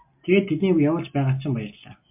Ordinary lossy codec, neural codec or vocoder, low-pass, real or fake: MP3, 32 kbps; none; 3.6 kHz; real